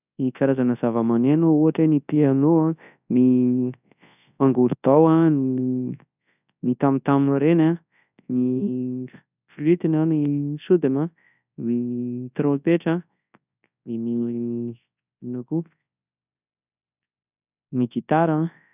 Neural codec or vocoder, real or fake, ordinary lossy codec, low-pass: codec, 24 kHz, 0.9 kbps, WavTokenizer, large speech release; fake; none; 3.6 kHz